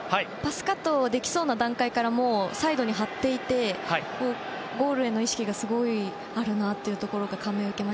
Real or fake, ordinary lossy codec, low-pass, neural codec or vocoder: real; none; none; none